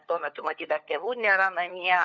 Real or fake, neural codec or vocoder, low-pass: fake; codec, 16 kHz, 4 kbps, FunCodec, trained on LibriTTS, 50 frames a second; 7.2 kHz